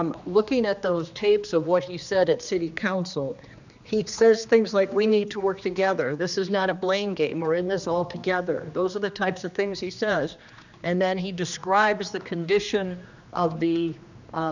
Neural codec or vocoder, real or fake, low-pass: codec, 16 kHz, 2 kbps, X-Codec, HuBERT features, trained on general audio; fake; 7.2 kHz